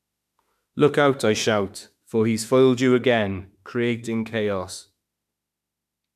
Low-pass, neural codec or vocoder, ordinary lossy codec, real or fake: 14.4 kHz; autoencoder, 48 kHz, 32 numbers a frame, DAC-VAE, trained on Japanese speech; none; fake